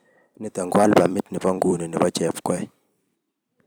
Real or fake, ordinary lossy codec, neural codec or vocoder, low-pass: fake; none; vocoder, 44.1 kHz, 128 mel bands every 256 samples, BigVGAN v2; none